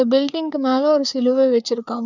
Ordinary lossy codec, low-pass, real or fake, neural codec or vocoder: none; 7.2 kHz; fake; codec, 16 kHz, 4 kbps, FreqCodec, larger model